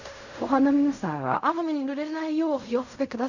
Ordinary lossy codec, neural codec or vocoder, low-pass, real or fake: none; codec, 16 kHz in and 24 kHz out, 0.4 kbps, LongCat-Audio-Codec, fine tuned four codebook decoder; 7.2 kHz; fake